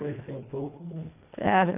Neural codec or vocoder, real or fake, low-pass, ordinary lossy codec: codec, 24 kHz, 1.5 kbps, HILCodec; fake; 3.6 kHz; none